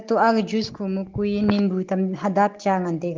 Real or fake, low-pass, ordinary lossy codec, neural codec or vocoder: real; 7.2 kHz; Opus, 16 kbps; none